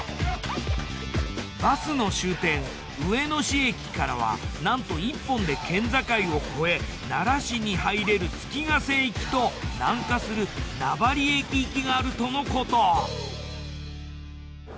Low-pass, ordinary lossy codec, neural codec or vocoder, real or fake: none; none; none; real